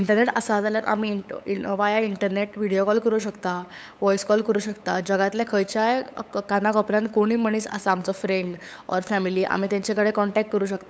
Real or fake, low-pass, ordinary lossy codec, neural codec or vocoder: fake; none; none; codec, 16 kHz, 8 kbps, FunCodec, trained on LibriTTS, 25 frames a second